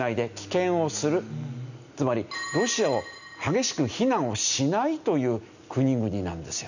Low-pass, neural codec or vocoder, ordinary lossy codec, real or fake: 7.2 kHz; none; none; real